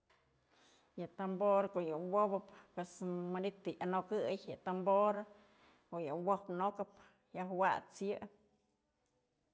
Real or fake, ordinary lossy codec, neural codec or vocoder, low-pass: real; none; none; none